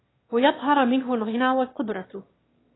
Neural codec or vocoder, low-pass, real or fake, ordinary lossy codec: autoencoder, 22.05 kHz, a latent of 192 numbers a frame, VITS, trained on one speaker; 7.2 kHz; fake; AAC, 16 kbps